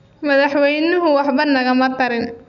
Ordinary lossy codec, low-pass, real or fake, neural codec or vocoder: none; 7.2 kHz; real; none